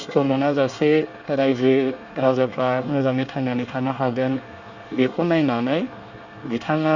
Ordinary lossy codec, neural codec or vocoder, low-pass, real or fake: Opus, 64 kbps; codec, 24 kHz, 1 kbps, SNAC; 7.2 kHz; fake